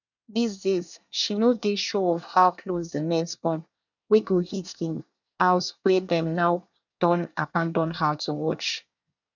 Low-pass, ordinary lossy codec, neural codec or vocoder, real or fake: 7.2 kHz; none; codec, 24 kHz, 1 kbps, SNAC; fake